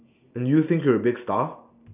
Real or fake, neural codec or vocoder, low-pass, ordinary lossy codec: real; none; 3.6 kHz; none